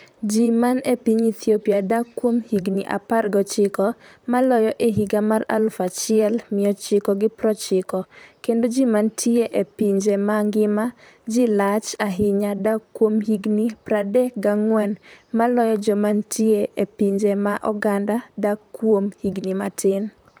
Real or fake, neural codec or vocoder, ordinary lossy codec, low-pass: fake; vocoder, 44.1 kHz, 128 mel bands, Pupu-Vocoder; none; none